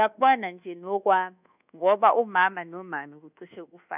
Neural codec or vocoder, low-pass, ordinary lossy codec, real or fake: codec, 24 kHz, 1.2 kbps, DualCodec; 3.6 kHz; none; fake